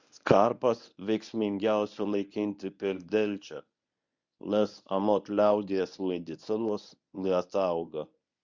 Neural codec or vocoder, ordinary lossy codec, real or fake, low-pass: codec, 24 kHz, 0.9 kbps, WavTokenizer, medium speech release version 1; Opus, 64 kbps; fake; 7.2 kHz